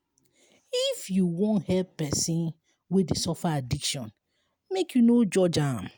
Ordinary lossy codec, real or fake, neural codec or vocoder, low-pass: none; real; none; none